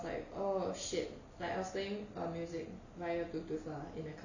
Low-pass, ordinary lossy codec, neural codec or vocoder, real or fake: 7.2 kHz; none; none; real